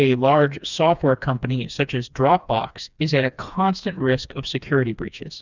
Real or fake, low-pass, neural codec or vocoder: fake; 7.2 kHz; codec, 16 kHz, 2 kbps, FreqCodec, smaller model